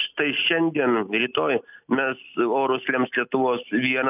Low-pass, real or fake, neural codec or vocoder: 3.6 kHz; real; none